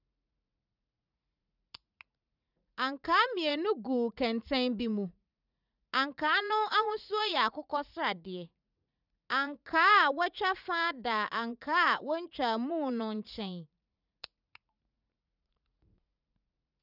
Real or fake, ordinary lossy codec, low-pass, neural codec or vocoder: fake; none; 5.4 kHz; vocoder, 44.1 kHz, 80 mel bands, Vocos